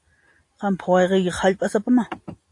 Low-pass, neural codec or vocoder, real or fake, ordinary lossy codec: 10.8 kHz; none; real; AAC, 48 kbps